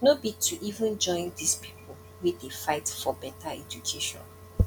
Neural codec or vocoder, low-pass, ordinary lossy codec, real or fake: none; 19.8 kHz; none; real